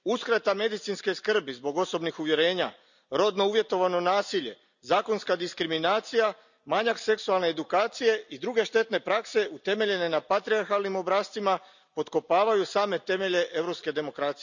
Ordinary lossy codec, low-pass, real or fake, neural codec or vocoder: none; 7.2 kHz; real; none